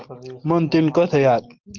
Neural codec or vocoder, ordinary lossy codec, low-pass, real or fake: none; Opus, 16 kbps; 7.2 kHz; real